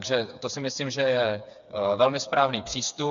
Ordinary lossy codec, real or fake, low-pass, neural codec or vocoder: MP3, 64 kbps; fake; 7.2 kHz; codec, 16 kHz, 4 kbps, FreqCodec, smaller model